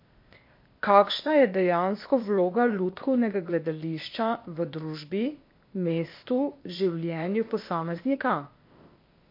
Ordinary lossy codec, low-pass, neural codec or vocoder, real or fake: MP3, 32 kbps; 5.4 kHz; codec, 16 kHz, 0.8 kbps, ZipCodec; fake